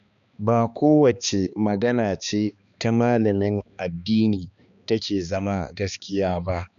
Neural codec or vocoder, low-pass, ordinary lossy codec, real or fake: codec, 16 kHz, 2 kbps, X-Codec, HuBERT features, trained on balanced general audio; 7.2 kHz; none; fake